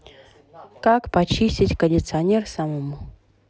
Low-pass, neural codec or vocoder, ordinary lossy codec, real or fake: none; none; none; real